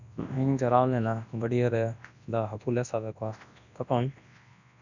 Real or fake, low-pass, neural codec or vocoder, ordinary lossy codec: fake; 7.2 kHz; codec, 24 kHz, 0.9 kbps, WavTokenizer, large speech release; none